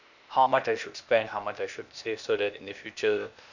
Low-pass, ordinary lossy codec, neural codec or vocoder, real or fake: 7.2 kHz; none; codec, 16 kHz, 0.8 kbps, ZipCodec; fake